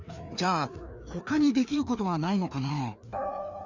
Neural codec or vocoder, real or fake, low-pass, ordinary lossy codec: codec, 16 kHz, 2 kbps, FreqCodec, larger model; fake; 7.2 kHz; none